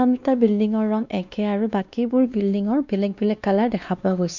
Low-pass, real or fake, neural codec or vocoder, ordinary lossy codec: 7.2 kHz; fake; codec, 16 kHz, 2 kbps, X-Codec, WavLM features, trained on Multilingual LibriSpeech; none